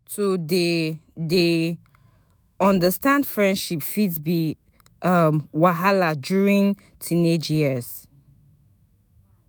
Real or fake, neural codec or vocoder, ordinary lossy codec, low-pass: fake; autoencoder, 48 kHz, 128 numbers a frame, DAC-VAE, trained on Japanese speech; none; none